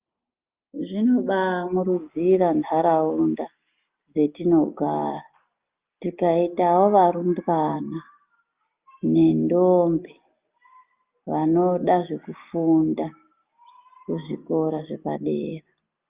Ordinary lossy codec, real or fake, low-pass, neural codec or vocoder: Opus, 24 kbps; real; 3.6 kHz; none